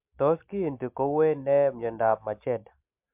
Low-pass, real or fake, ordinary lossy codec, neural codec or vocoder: 3.6 kHz; real; MP3, 32 kbps; none